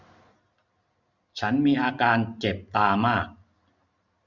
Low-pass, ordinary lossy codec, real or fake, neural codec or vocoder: 7.2 kHz; none; real; none